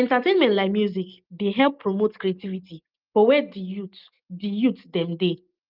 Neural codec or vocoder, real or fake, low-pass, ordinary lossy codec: none; real; 5.4 kHz; Opus, 24 kbps